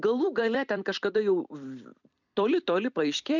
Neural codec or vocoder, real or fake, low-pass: vocoder, 44.1 kHz, 128 mel bands every 256 samples, BigVGAN v2; fake; 7.2 kHz